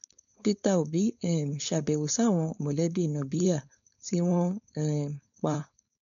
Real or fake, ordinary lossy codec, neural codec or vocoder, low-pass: fake; none; codec, 16 kHz, 4.8 kbps, FACodec; 7.2 kHz